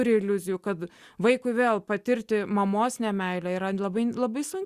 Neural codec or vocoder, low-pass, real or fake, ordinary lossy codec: none; 14.4 kHz; real; Opus, 64 kbps